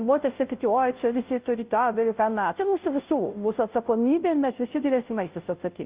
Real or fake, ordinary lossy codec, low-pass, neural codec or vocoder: fake; Opus, 32 kbps; 3.6 kHz; codec, 16 kHz, 0.5 kbps, FunCodec, trained on Chinese and English, 25 frames a second